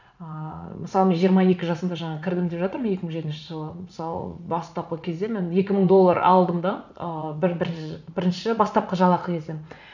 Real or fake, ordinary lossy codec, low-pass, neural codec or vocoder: fake; none; 7.2 kHz; vocoder, 44.1 kHz, 80 mel bands, Vocos